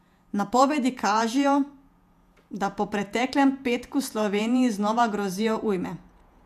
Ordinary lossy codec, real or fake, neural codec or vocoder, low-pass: none; fake; vocoder, 48 kHz, 128 mel bands, Vocos; 14.4 kHz